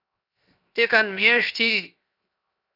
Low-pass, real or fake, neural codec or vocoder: 5.4 kHz; fake; codec, 16 kHz, 0.7 kbps, FocalCodec